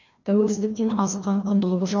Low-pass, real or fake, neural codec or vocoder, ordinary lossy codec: 7.2 kHz; fake; codec, 16 kHz, 1 kbps, FreqCodec, larger model; none